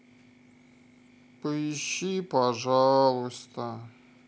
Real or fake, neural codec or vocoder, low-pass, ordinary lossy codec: real; none; none; none